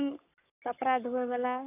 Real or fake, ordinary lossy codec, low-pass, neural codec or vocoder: fake; AAC, 32 kbps; 3.6 kHz; codec, 44.1 kHz, 7.8 kbps, Pupu-Codec